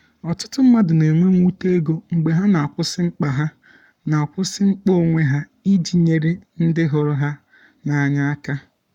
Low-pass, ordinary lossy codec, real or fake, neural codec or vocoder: 19.8 kHz; none; fake; codec, 44.1 kHz, 7.8 kbps, Pupu-Codec